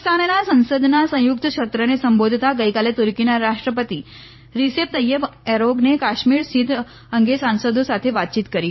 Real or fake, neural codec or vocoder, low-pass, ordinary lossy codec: fake; vocoder, 44.1 kHz, 128 mel bands every 512 samples, BigVGAN v2; 7.2 kHz; MP3, 24 kbps